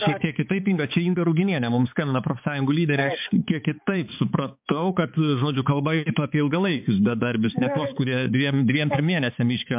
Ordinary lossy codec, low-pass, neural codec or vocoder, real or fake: MP3, 32 kbps; 3.6 kHz; codec, 16 kHz, 4 kbps, X-Codec, HuBERT features, trained on balanced general audio; fake